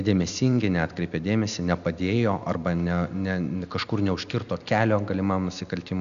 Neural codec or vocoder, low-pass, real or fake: none; 7.2 kHz; real